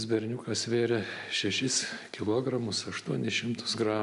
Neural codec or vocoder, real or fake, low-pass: none; real; 10.8 kHz